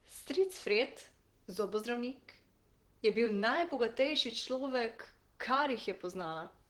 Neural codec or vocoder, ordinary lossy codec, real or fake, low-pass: vocoder, 44.1 kHz, 128 mel bands, Pupu-Vocoder; Opus, 16 kbps; fake; 19.8 kHz